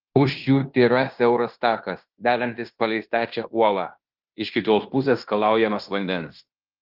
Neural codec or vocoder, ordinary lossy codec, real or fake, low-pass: codec, 16 kHz in and 24 kHz out, 0.9 kbps, LongCat-Audio-Codec, fine tuned four codebook decoder; Opus, 24 kbps; fake; 5.4 kHz